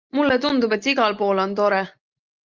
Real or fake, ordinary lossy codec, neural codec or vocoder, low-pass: real; Opus, 32 kbps; none; 7.2 kHz